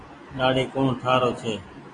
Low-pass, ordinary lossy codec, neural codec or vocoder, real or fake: 9.9 kHz; AAC, 32 kbps; none; real